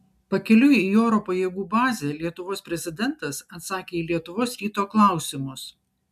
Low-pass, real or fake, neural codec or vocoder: 14.4 kHz; real; none